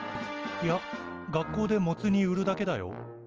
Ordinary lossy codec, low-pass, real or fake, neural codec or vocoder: Opus, 24 kbps; 7.2 kHz; real; none